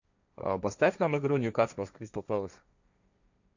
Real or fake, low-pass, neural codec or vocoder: fake; 7.2 kHz; codec, 16 kHz, 1.1 kbps, Voila-Tokenizer